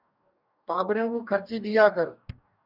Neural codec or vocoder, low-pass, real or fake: codec, 44.1 kHz, 2.6 kbps, DAC; 5.4 kHz; fake